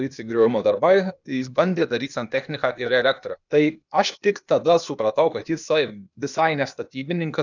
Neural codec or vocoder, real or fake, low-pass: codec, 16 kHz, 0.8 kbps, ZipCodec; fake; 7.2 kHz